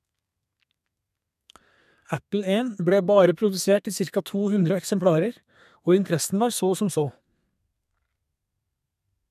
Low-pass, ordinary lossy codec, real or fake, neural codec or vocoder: 14.4 kHz; none; fake; codec, 32 kHz, 1.9 kbps, SNAC